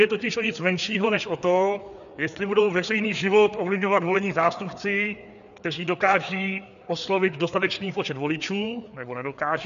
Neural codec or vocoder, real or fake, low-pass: codec, 16 kHz, 4 kbps, FreqCodec, larger model; fake; 7.2 kHz